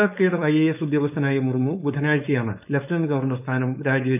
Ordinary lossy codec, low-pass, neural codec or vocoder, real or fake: none; 3.6 kHz; codec, 16 kHz, 4.8 kbps, FACodec; fake